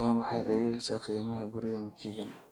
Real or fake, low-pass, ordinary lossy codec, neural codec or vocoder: fake; 19.8 kHz; none; codec, 44.1 kHz, 2.6 kbps, DAC